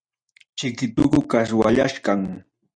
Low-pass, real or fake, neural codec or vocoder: 9.9 kHz; real; none